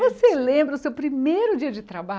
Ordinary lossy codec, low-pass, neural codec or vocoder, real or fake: none; none; none; real